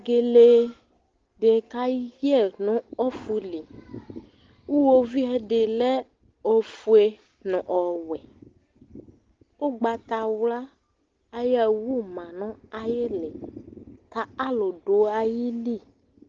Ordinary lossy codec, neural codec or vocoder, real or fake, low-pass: Opus, 16 kbps; none; real; 7.2 kHz